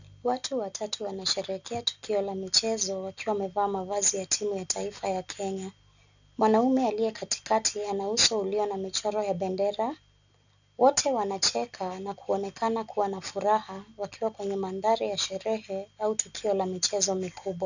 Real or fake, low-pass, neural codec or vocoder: real; 7.2 kHz; none